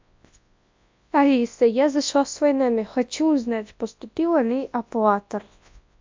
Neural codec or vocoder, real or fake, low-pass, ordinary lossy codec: codec, 24 kHz, 0.9 kbps, WavTokenizer, large speech release; fake; 7.2 kHz; MP3, 64 kbps